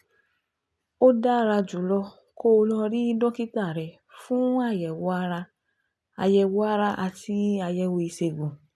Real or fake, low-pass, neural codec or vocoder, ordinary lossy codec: real; none; none; none